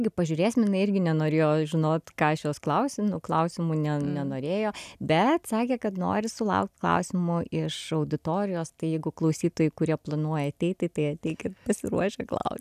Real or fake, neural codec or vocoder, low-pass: real; none; 14.4 kHz